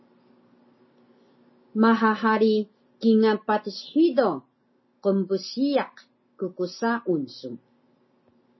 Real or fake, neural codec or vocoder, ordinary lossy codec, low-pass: real; none; MP3, 24 kbps; 7.2 kHz